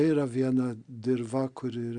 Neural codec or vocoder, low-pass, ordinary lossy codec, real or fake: none; 9.9 kHz; Opus, 64 kbps; real